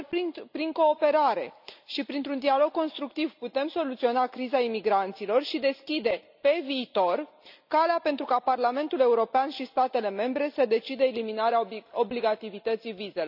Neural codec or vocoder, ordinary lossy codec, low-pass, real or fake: none; none; 5.4 kHz; real